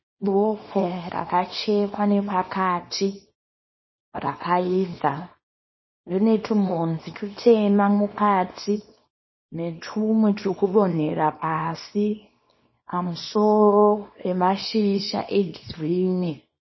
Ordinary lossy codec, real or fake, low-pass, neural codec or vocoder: MP3, 24 kbps; fake; 7.2 kHz; codec, 24 kHz, 0.9 kbps, WavTokenizer, small release